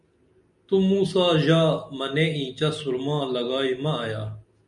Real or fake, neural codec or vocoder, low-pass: real; none; 10.8 kHz